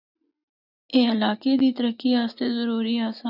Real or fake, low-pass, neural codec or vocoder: real; 5.4 kHz; none